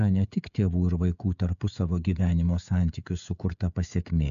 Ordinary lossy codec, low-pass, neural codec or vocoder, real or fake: MP3, 96 kbps; 7.2 kHz; codec, 16 kHz, 16 kbps, FreqCodec, smaller model; fake